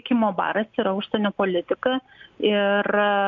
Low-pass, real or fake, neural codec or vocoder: 7.2 kHz; real; none